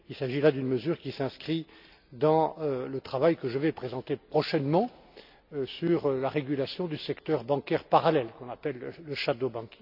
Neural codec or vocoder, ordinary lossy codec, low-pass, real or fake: none; none; 5.4 kHz; real